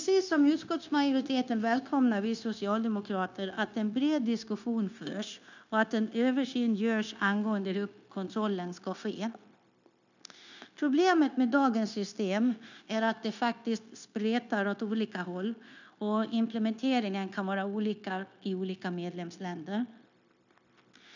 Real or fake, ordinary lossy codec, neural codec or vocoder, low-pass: fake; none; codec, 16 kHz, 0.9 kbps, LongCat-Audio-Codec; 7.2 kHz